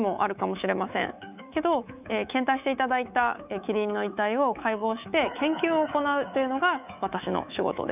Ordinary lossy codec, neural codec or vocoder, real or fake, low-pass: none; codec, 24 kHz, 3.1 kbps, DualCodec; fake; 3.6 kHz